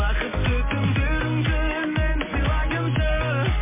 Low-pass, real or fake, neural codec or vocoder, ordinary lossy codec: 3.6 kHz; real; none; MP3, 16 kbps